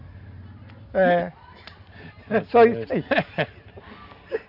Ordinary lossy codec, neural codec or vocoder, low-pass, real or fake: none; none; 5.4 kHz; real